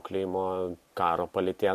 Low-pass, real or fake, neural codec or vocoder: 14.4 kHz; real; none